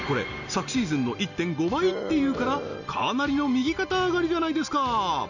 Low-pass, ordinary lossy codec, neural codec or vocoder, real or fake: 7.2 kHz; none; none; real